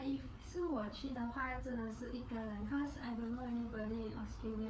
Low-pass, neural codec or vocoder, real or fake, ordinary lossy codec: none; codec, 16 kHz, 4 kbps, FreqCodec, larger model; fake; none